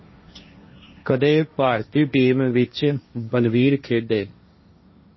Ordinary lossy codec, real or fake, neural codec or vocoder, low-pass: MP3, 24 kbps; fake; codec, 16 kHz, 1.1 kbps, Voila-Tokenizer; 7.2 kHz